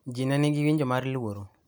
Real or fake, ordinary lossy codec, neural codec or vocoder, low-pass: real; none; none; none